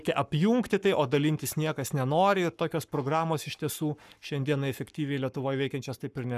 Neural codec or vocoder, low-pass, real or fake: codec, 44.1 kHz, 7.8 kbps, Pupu-Codec; 14.4 kHz; fake